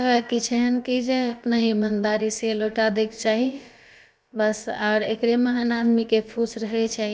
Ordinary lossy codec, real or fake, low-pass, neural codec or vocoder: none; fake; none; codec, 16 kHz, about 1 kbps, DyCAST, with the encoder's durations